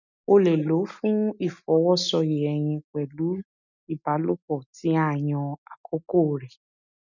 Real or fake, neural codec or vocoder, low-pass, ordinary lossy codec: real; none; 7.2 kHz; none